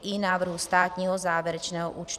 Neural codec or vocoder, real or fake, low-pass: vocoder, 44.1 kHz, 128 mel bands every 512 samples, BigVGAN v2; fake; 14.4 kHz